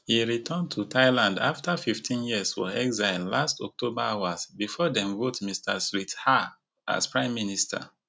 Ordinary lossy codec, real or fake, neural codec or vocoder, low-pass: none; real; none; none